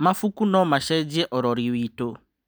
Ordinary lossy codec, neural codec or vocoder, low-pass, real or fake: none; none; none; real